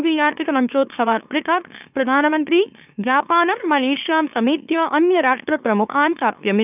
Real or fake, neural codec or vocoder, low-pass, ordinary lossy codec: fake; autoencoder, 44.1 kHz, a latent of 192 numbers a frame, MeloTTS; 3.6 kHz; none